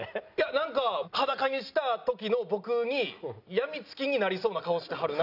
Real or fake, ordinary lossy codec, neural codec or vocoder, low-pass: real; none; none; 5.4 kHz